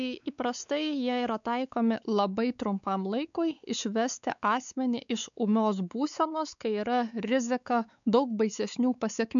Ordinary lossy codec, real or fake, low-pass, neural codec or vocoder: MP3, 96 kbps; fake; 7.2 kHz; codec, 16 kHz, 4 kbps, X-Codec, WavLM features, trained on Multilingual LibriSpeech